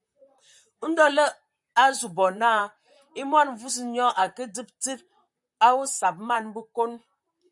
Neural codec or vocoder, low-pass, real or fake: vocoder, 44.1 kHz, 128 mel bands, Pupu-Vocoder; 10.8 kHz; fake